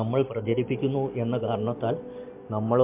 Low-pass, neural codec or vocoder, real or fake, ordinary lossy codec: 3.6 kHz; none; real; MP3, 24 kbps